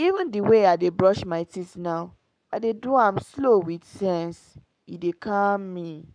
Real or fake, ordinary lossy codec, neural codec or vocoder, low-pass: fake; none; codec, 44.1 kHz, 7.8 kbps, Pupu-Codec; 9.9 kHz